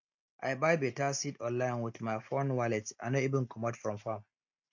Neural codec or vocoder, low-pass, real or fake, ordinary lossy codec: none; 7.2 kHz; real; MP3, 48 kbps